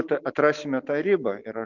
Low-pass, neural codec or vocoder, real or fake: 7.2 kHz; none; real